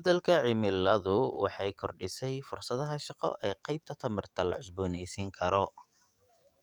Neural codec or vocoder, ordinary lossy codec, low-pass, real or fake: codec, 44.1 kHz, 7.8 kbps, DAC; none; 19.8 kHz; fake